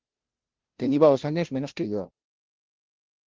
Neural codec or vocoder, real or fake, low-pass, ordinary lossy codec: codec, 16 kHz, 0.5 kbps, FunCodec, trained on Chinese and English, 25 frames a second; fake; 7.2 kHz; Opus, 16 kbps